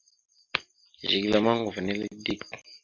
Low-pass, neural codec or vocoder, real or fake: 7.2 kHz; none; real